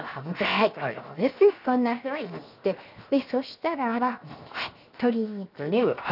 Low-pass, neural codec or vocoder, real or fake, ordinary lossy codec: 5.4 kHz; codec, 16 kHz, 0.7 kbps, FocalCodec; fake; none